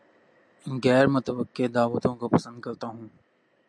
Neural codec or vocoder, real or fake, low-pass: none; real; 9.9 kHz